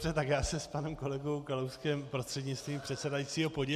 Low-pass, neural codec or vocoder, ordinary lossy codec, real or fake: 14.4 kHz; none; MP3, 96 kbps; real